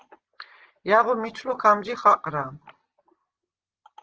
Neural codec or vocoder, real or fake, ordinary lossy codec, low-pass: none; real; Opus, 16 kbps; 7.2 kHz